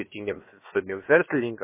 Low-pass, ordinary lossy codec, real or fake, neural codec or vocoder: 3.6 kHz; MP3, 16 kbps; fake; codec, 16 kHz, about 1 kbps, DyCAST, with the encoder's durations